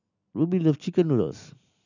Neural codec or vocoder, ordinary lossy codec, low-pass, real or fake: none; none; 7.2 kHz; real